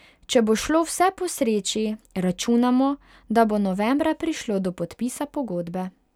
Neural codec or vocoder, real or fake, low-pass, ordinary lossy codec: none; real; 19.8 kHz; none